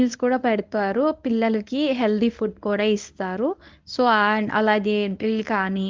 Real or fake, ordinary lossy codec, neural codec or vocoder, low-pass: fake; Opus, 24 kbps; codec, 24 kHz, 0.9 kbps, WavTokenizer, medium speech release version 1; 7.2 kHz